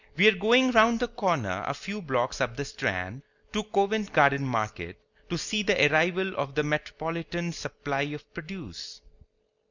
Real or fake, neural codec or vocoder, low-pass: real; none; 7.2 kHz